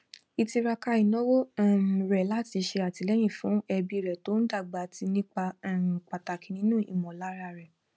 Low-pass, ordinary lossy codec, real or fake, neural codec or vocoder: none; none; real; none